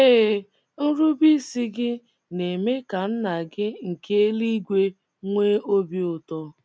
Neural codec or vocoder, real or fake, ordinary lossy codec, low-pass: none; real; none; none